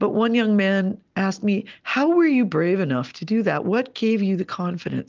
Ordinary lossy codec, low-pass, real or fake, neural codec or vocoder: Opus, 24 kbps; 7.2 kHz; real; none